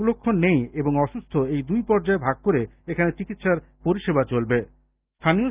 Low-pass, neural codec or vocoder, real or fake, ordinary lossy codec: 3.6 kHz; none; real; Opus, 24 kbps